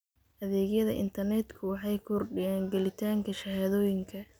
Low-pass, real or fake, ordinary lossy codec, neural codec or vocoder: none; real; none; none